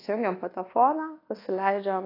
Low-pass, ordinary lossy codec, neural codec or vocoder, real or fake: 5.4 kHz; AAC, 24 kbps; codec, 24 kHz, 1.2 kbps, DualCodec; fake